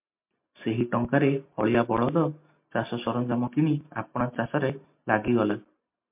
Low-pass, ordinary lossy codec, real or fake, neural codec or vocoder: 3.6 kHz; MP3, 32 kbps; real; none